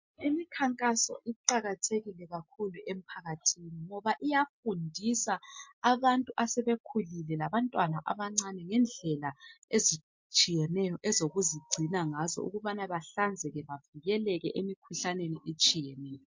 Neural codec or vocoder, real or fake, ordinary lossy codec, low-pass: none; real; MP3, 48 kbps; 7.2 kHz